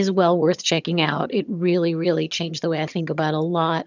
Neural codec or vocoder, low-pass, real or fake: vocoder, 22.05 kHz, 80 mel bands, HiFi-GAN; 7.2 kHz; fake